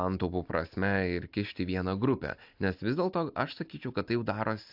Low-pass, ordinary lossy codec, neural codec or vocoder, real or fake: 5.4 kHz; AAC, 48 kbps; none; real